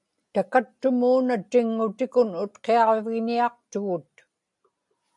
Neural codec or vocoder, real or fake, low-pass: none; real; 10.8 kHz